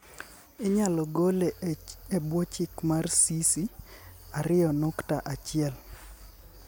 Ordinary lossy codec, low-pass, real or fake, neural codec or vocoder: none; none; real; none